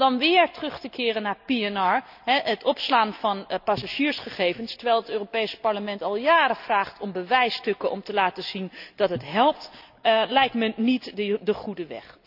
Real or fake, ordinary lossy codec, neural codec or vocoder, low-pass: real; none; none; 5.4 kHz